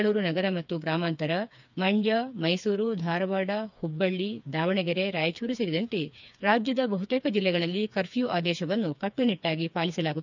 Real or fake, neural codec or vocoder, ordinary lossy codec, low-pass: fake; codec, 16 kHz, 4 kbps, FreqCodec, smaller model; none; 7.2 kHz